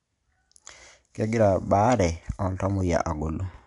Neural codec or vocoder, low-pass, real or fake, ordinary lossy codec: none; 10.8 kHz; real; none